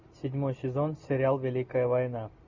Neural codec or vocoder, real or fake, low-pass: none; real; 7.2 kHz